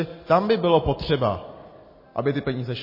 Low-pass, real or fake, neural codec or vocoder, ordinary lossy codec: 5.4 kHz; real; none; MP3, 24 kbps